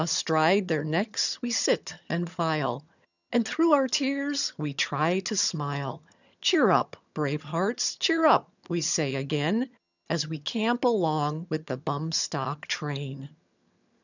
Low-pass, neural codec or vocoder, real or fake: 7.2 kHz; vocoder, 22.05 kHz, 80 mel bands, HiFi-GAN; fake